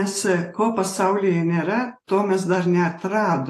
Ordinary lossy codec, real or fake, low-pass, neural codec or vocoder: AAC, 48 kbps; real; 14.4 kHz; none